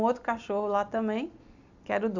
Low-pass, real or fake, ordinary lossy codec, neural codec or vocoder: 7.2 kHz; real; AAC, 48 kbps; none